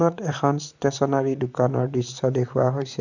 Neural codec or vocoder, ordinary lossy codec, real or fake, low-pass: vocoder, 44.1 kHz, 128 mel bands, Pupu-Vocoder; none; fake; 7.2 kHz